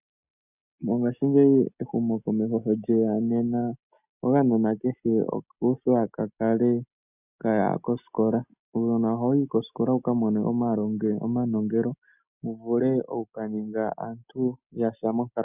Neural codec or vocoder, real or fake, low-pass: none; real; 3.6 kHz